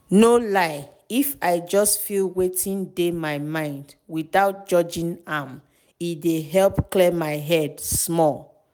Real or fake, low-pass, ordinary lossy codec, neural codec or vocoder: real; none; none; none